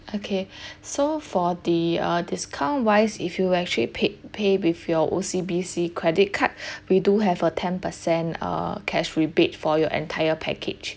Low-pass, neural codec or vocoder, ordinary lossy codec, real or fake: none; none; none; real